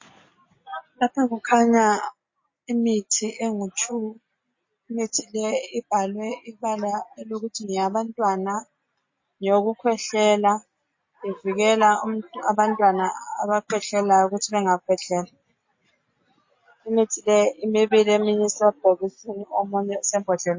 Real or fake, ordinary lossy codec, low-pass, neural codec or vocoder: real; MP3, 32 kbps; 7.2 kHz; none